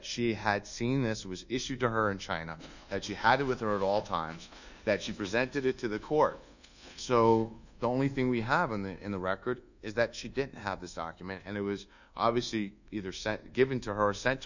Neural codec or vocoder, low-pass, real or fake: codec, 24 kHz, 1.2 kbps, DualCodec; 7.2 kHz; fake